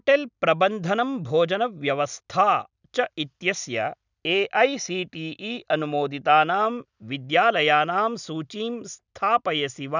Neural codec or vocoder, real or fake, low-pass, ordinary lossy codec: none; real; 7.2 kHz; none